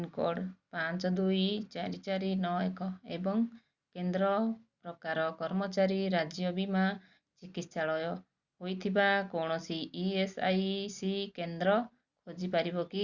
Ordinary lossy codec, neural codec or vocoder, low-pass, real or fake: Opus, 64 kbps; none; 7.2 kHz; real